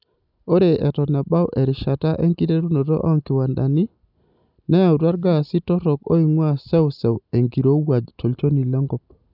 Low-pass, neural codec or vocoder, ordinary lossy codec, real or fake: 5.4 kHz; none; none; real